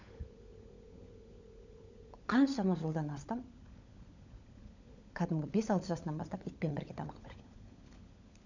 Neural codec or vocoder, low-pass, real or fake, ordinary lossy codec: codec, 16 kHz, 16 kbps, FunCodec, trained on LibriTTS, 50 frames a second; 7.2 kHz; fake; none